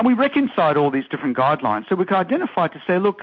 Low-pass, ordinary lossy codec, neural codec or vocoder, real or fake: 7.2 kHz; MP3, 48 kbps; none; real